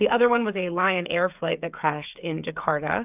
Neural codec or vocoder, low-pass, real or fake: codec, 16 kHz, 8 kbps, FreqCodec, smaller model; 3.6 kHz; fake